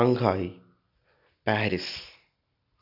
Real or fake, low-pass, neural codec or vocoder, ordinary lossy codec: real; 5.4 kHz; none; none